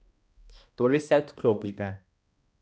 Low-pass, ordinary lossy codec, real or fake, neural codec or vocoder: none; none; fake; codec, 16 kHz, 1 kbps, X-Codec, HuBERT features, trained on balanced general audio